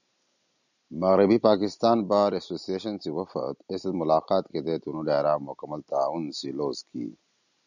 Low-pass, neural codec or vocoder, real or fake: 7.2 kHz; none; real